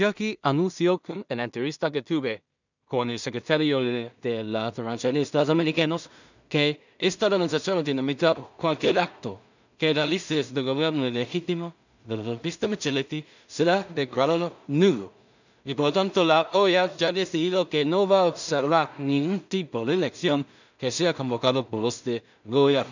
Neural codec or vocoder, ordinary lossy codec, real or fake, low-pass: codec, 16 kHz in and 24 kHz out, 0.4 kbps, LongCat-Audio-Codec, two codebook decoder; none; fake; 7.2 kHz